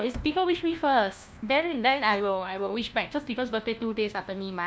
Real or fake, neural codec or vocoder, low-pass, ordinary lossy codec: fake; codec, 16 kHz, 1 kbps, FunCodec, trained on LibriTTS, 50 frames a second; none; none